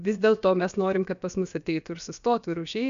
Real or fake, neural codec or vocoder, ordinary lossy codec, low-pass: fake; codec, 16 kHz, about 1 kbps, DyCAST, with the encoder's durations; AAC, 96 kbps; 7.2 kHz